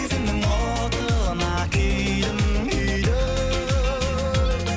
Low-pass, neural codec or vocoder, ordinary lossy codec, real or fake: none; none; none; real